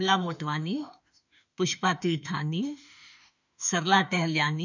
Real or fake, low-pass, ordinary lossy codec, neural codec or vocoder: fake; 7.2 kHz; none; autoencoder, 48 kHz, 32 numbers a frame, DAC-VAE, trained on Japanese speech